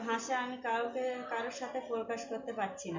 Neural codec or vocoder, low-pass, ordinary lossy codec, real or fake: none; 7.2 kHz; MP3, 64 kbps; real